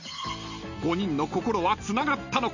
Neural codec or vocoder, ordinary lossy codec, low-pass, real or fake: none; none; 7.2 kHz; real